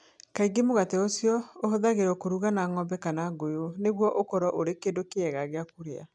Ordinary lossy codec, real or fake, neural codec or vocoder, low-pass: none; real; none; none